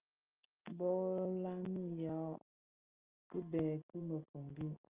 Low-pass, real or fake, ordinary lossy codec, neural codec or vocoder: 3.6 kHz; real; Opus, 24 kbps; none